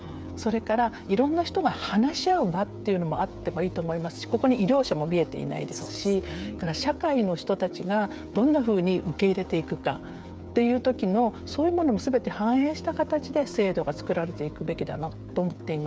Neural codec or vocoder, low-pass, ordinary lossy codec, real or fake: codec, 16 kHz, 8 kbps, FreqCodec, smaller model; none; none; fake